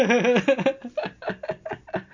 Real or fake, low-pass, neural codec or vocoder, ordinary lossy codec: real; 7.2 kHz; none; MP3, 64 kbps